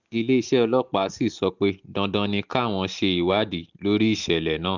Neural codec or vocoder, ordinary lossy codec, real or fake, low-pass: none; none; real; 7.2 kHz